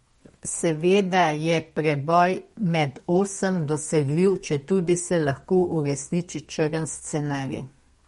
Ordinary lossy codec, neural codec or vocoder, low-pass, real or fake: MP3, 48 kbps; codec, 32 kHz, 1.9 kbps, SNAC; 14.4 kHz; fake